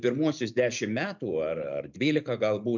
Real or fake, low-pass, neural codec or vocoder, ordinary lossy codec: real; 7.2 kHz; none; MP3, 64 kbps